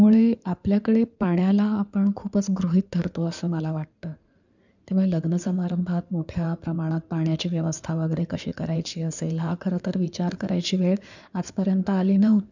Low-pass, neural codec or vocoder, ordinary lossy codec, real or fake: 7.2 kHz; codec, 16 kHz in and 24 kHz out, 2.2 kbps, FireRedTTS-2 codec; none; fake